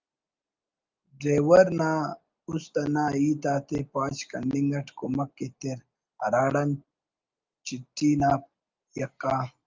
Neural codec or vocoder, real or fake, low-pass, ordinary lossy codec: none; real; 7.2 kHz; Opus, 24 kbps